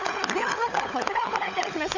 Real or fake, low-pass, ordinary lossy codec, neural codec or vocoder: fake; 7.2 kHz; none; codec, 16 kHz, 16 kbps, FunCodec, trained on LibriTTS, 50 frames a second